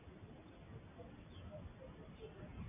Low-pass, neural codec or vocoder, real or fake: 3.6 kHz; none; real